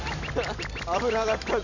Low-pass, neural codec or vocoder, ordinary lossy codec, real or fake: 7.2 kHz; none; none; real